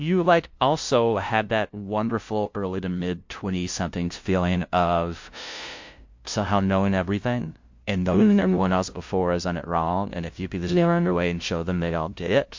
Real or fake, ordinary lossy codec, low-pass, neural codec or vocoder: fake; MP3, 48 kbps; 7.2 kHz; codec, 16 kHz, 0.5 kbps, FunCodec, trained on LibriTTS, 25 frames a second